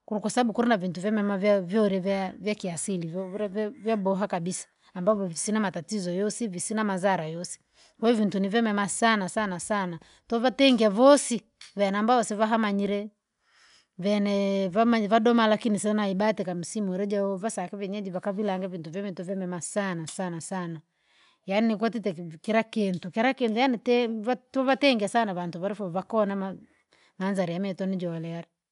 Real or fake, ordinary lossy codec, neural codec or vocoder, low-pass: real; none; none; 10.8 kHz